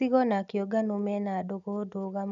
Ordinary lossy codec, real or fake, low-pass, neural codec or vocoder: none; real; 7.2 kHz; none